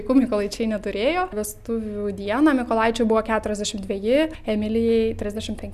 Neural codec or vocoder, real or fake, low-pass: none; real; 14.4 kHz